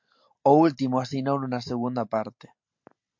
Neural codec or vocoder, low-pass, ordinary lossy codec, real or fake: none; 7.2 kHz; MP3, 48 kbps; real